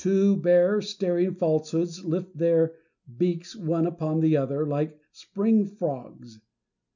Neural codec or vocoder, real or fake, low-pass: none; real; 7.2 kHz